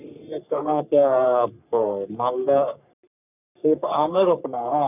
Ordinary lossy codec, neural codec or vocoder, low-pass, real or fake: none; codec, 44.1 kHz, 3.4 kbps, Pupu-Codec; 3.6 kHz; fake